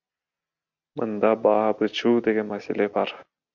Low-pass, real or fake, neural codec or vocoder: 7.2 kHz; real; none